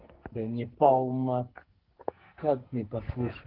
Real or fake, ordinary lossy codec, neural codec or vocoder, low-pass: fake; Opus, 16 kbps; codec, 44.1 kHz, 2.6 kbps, SNAC; 5.4 kHz